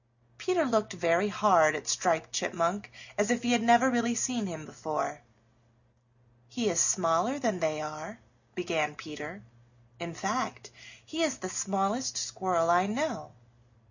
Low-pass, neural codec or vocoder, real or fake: 7.2 kHz; none; real